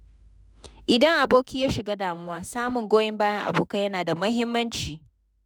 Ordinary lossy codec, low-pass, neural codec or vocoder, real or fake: none; none; autoencoder, 48 kHz, 32 numbers a frame, DAC-VAE, trained on Japanese speech; fake